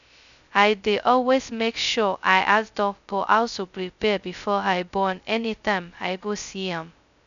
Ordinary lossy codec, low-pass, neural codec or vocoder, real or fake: MP3, 96 kbps; 7.2 kHz; codec, 16 kHz, 0.2 kbps, FocalCodec; fake